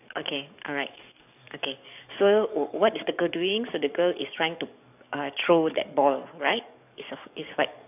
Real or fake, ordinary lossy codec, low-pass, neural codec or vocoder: fake; none; 3.6 kHz; codec, 44.1 kHz, 7.8 kbps, DAC